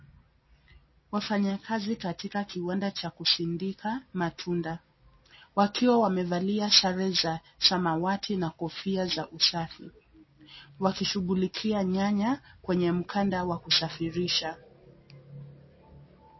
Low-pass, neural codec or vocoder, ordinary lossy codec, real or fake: 7.2 kHz; none; MP3, 24 kbps; real